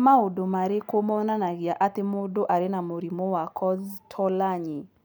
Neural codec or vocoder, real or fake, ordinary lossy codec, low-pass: none; real; none; none